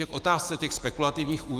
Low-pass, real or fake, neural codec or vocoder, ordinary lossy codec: 14.4 kHz; fake; autoencoder, 48 kHz, 128 numbers a frame, DAC-VAE, trained on Japanese speech; Opus, 32 kbps